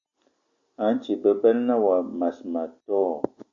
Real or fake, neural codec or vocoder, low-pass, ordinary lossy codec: real; none; 7.2 kHz; AAC, 48 kbps